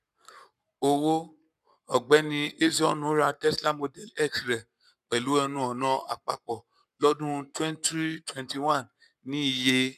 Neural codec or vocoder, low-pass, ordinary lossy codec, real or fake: codec, 44.1 kHz, 7.8 kbps, Pupu-Codec; 14.4 kHz; none; fake